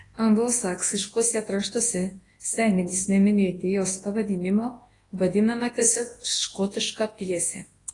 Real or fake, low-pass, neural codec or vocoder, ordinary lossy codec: fake; 10.8 kHz; codec, 24 kHz, 0.9 kbps, WavTokenizer, large speech release; AAC, 32 kbps